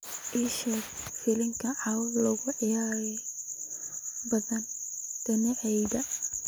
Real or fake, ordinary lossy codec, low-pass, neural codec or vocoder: real; none; none; none